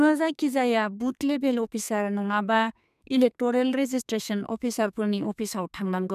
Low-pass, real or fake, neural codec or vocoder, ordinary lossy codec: 14.4 kHz; fake; codec, 32 kHz, 1.9 kbps, SNAC; none